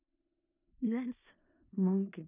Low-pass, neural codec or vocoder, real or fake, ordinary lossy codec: 3.6 kHz; codec, 16 kHz in and 24 kHz out, 0.4 kbps, LongCat-Audio-Codec, four codebook decoder; fake; none